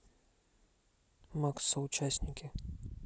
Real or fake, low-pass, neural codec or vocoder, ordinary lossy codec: real; none; none; none